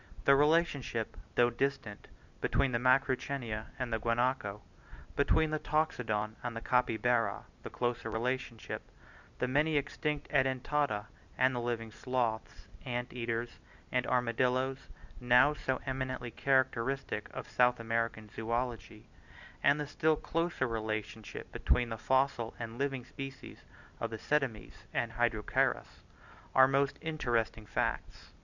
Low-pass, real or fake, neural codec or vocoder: 7.2 kHz; real; none